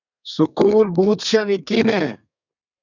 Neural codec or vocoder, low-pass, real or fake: codec, 32 kHz, 1.9 kbps, SNAC; 7.2 kHz; fake